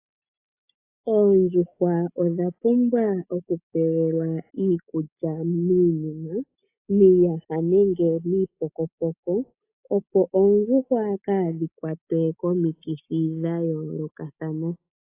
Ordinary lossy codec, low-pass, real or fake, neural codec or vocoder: AAC, 24 kbps; 3.6 kHz; real; none